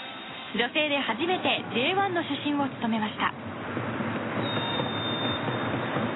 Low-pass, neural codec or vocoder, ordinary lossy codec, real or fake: 7.2 kHz; none; AAC, 16 kbps; real